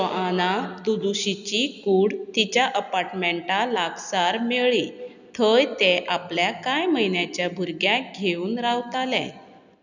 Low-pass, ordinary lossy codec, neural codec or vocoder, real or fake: 7.2 kHz; none; none; real